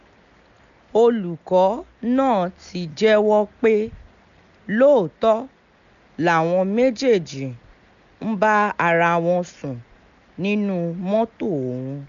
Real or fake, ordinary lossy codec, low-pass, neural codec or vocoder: real; none; 7.2 kHz; none